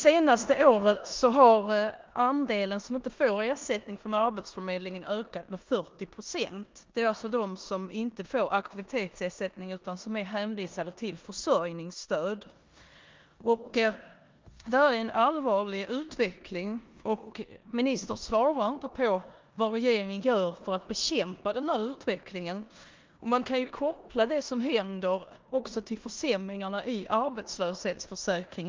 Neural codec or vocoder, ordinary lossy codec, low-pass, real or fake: codec, 16 kHz in and 24 kHz out, 0.9 kbps, LongCat-Audio-Codec, four codebook decoder; Opus, 24 kbps; 7.2 kHz; fake